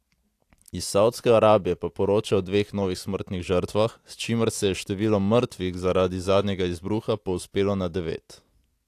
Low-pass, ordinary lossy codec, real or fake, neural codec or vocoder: 14.4 kHz; AAC, 64 kbps; real; none